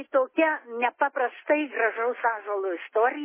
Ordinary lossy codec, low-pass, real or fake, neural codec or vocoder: MP3, 16 kbps; 3.6 kHz; real; none